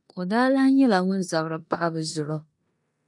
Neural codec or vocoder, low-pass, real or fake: codec, 16 kHz in and 24 kHz out, 0.9 kbps, LongCat-Audio-Codec, four codebook decoder; 10.8 kHz; fake